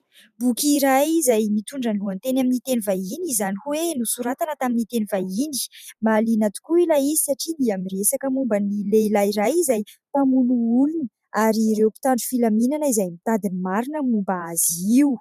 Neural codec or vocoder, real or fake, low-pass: vocoder, 44.1 kHz, 128 mel bands every 512 samples, BigVGAN v2; fake; 14.4 kHz